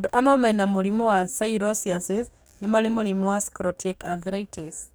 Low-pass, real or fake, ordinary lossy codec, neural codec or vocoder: none; fake; none; codec, 44.1 kHz, 2.6 kbps, DAC